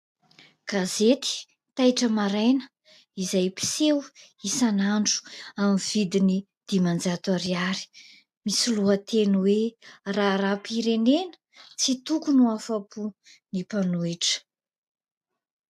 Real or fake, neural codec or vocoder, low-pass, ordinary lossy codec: real; none; 14.4 kHz; AAC, 96 kbps